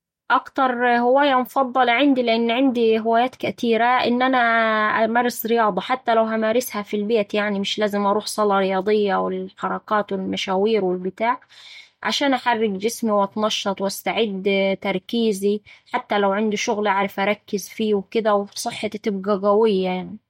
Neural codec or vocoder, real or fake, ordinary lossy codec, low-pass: none; real; MP3, 64 kbps; 19.8 kHz